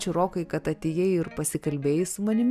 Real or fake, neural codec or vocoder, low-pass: real; none; 14.4 kHz